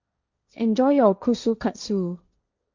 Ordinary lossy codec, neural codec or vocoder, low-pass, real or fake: Opus, 64 kbps; codec, 16 kHz, 1.1 kbps, Voila-Tokenizer; 7.2 kHz; fake